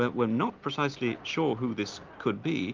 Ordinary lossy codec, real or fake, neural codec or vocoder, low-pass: Opus, 32 kbps; real; none; 7.2 kHz